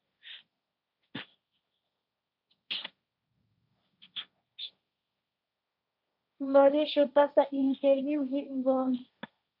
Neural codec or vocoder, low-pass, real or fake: codec, 16 kHz, 1.1 kbps, Voila-Tokenizer; 5.4 kHz; fake